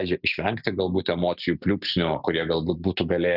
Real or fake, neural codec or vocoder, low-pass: real; none; 5.4 kHz